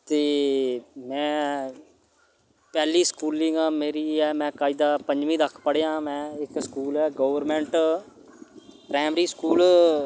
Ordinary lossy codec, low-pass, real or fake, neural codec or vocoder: none; none; real; none